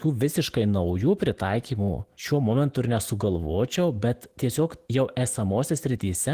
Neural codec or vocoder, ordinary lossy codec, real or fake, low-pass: autoencoder, 48 kHz, 128 numbers a frame, DAC-VAE, trained on Japanese speech; Opus, 16 kbps; fake; 14.4 kHz